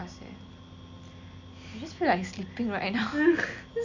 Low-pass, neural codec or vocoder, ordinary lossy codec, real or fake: 7.2 kHz; none; none; real